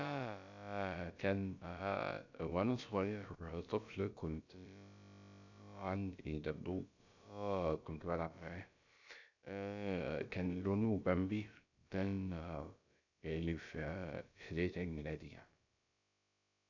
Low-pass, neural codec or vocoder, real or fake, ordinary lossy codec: 7.2 kHz; codec, 16 kHz, about 1 kbps, DyCAST, with the encoder's durations; fake; none